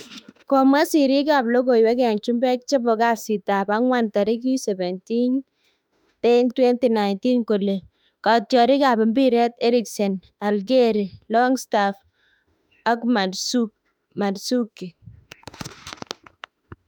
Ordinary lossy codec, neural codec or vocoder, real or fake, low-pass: none; autoencoder, 48 kHz, 32 numbers a frame, DAC-VAE, trained on Japanese speech; fake; 19.8 kHz